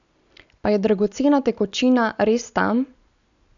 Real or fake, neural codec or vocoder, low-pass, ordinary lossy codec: real; none; 7.2 kHz; none